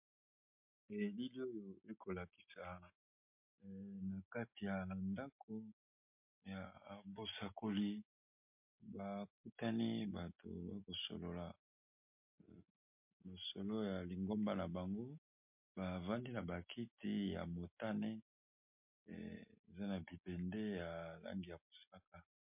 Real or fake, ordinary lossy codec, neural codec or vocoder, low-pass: real; MP3, 32 kbps; none; 3.6 kHz